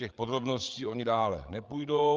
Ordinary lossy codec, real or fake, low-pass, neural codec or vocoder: Opus, 32 kbps; fake; 7.2 kHz; codec, 16 kHz, 8 kbps, FreqCodec, larger model